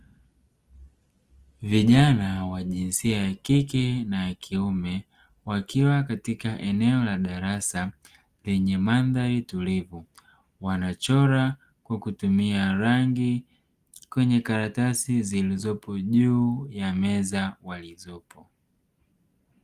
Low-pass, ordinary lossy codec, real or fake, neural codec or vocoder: 14.4 kHz; Opus, 24 kbps; real; none